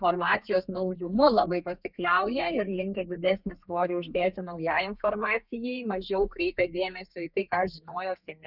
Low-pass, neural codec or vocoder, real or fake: 5.4 kHz; codec, 32 kHz, 1.9 kbps, SNAC; fake